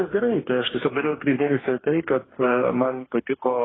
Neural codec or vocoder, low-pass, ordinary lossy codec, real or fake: codec, 44.1 kHz, 2.6 kbps, DAC; 7.2 kHz; AAC, 16 kbps; fake